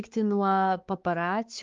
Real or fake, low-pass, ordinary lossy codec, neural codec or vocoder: fake; 7.2 kHz; Opus, 32 kbps; codec, 16 kHz, 4 kbps, X-Codec, HuBERT features, trained on balanced general audio